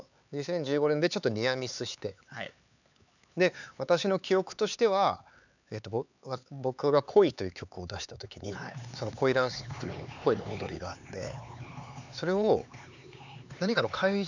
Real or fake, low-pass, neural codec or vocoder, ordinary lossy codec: fake; 7.2 kHz; codec, 16 kHz, 4 kbps, X-Codec, HuBERT features, trained on LibriSpeech; none